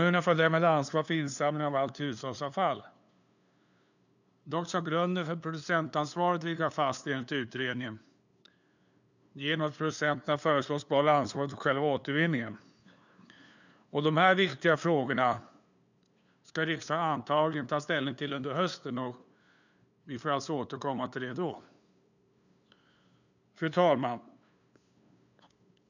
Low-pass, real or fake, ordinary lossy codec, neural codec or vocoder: 7.2 kHz; fake; none; codec, 16 kHz, 2 kbps, FunCodec, trained on LibriTTS, 25 frames a second